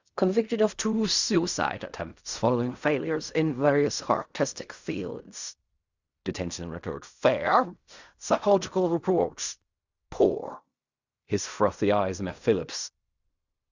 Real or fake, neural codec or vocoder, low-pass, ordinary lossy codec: fake; codec, 16 kHz in and 24 kHz out, 0.4 kbps, LongCat-Audio-Codec, fine tuned four codebook decoder; 7.2 kHz; Opus, 64 kbps